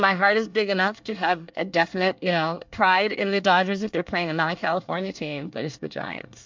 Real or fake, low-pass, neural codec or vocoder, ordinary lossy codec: fake; 7.2 kHz; codec, 24 kHz, 1 kbps, SNAC; MP3, 64 kbps